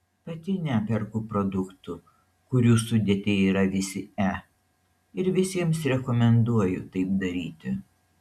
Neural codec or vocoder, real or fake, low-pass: none; real; 14.4 kHz